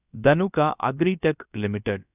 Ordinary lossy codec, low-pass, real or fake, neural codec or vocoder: none; 3.6 kHz; fake; codec, 16 kHz, about 1 kbps, DyCAST, with the encoder's durations